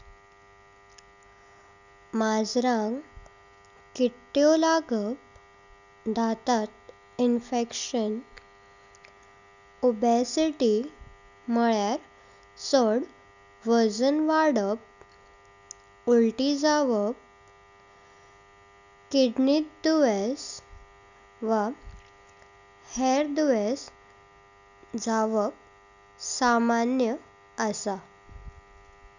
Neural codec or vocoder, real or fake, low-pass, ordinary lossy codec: none; real; 7.2 kHz; none